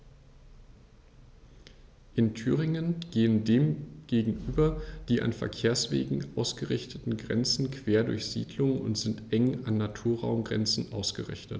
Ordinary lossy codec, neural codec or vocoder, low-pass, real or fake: none; none; none; real